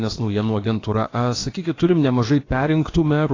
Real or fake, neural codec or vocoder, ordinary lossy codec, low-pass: fake; codec, 16 kHz, about 1 kbps, DyCAST, with the encoder's durations; AAC, 32 kbps; 7.2 kHz